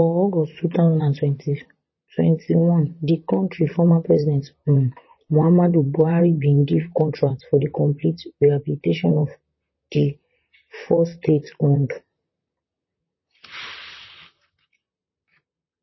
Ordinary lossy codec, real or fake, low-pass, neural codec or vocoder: MP3, 24 kbps; fake; 7.2 kHz; vocoder, 44.1 kHz, 128 mel bands, Pupu-Vocoder